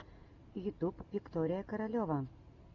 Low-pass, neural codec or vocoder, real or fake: 7.2 kHz; none; real